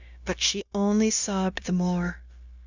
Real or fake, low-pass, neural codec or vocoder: fake; 7.2 kHz; codec, 16 kHz, 0.9 kbps, LongCat-Audio-Codec